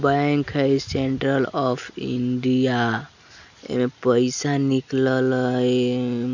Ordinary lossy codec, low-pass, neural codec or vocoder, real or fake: none; 7.2 kHz; none; real